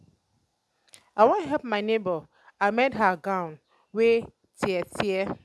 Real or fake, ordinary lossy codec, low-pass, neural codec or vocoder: real; none; none; none